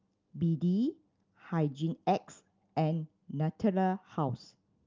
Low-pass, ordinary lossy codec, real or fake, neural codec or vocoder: 7.2 kHz; Opus, 32 kbps; real; none